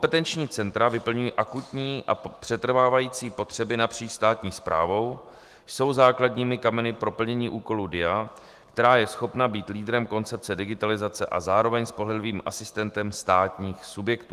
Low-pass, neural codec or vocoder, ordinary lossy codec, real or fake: 14.4 kHz; autoencoder, 48 kHz, 128 numbers a frame, DAC-VAE, trained on Japanese speech; Opus, 24 kbps; fake